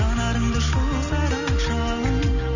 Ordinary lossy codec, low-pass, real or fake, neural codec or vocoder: none; 7.2 kHz; real; none